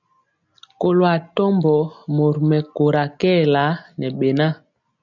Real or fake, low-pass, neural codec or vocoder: real; 7.2 kHz; none